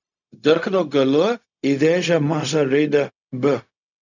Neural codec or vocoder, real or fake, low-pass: codec, 16 kHz, 0.4 kbps, LongCat-Audio-Codec; fake; 7.2 kHz